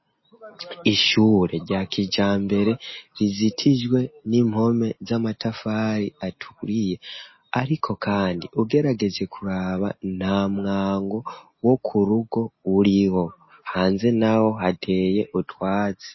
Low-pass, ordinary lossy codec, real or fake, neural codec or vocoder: 7.2 kHz; MP3, 24 kbps; real; none